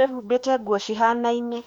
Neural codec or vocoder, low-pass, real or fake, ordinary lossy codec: autoencoder, 48 kHz, 32 numbers a frame, DAC-VAE, trained on Japanese speech; 19.8 kHz; fake; Opus, 64 kbps